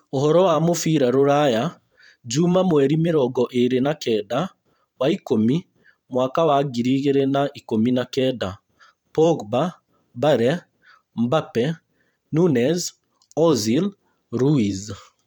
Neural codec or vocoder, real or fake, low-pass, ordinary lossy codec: vocoder, 44.1 kHz, 128 mel bands every 512 samples, BigVGAN v2; fake; 19.8 kHz; none